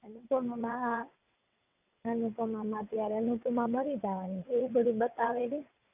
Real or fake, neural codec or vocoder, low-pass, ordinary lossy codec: fake; vocoder, 22.05 kHz, 80 mel bands, WaveNeXt; 3.6 kHz; none